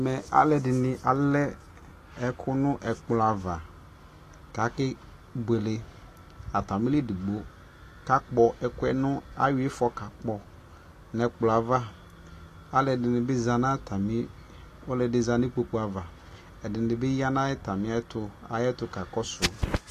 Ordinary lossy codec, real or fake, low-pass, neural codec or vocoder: AAC, 48 kbps; real; 14.4 kHz; none